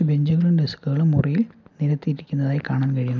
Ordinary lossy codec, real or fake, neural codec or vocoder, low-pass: none; real; none; 7.2 kHz